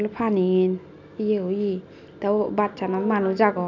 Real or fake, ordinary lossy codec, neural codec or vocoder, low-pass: real; none; none; 7.2 kHz